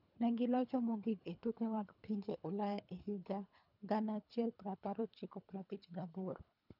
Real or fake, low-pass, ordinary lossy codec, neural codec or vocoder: fake; 5.4 kHz; none; codec, 24 kHz, 3 kbps, HILCodec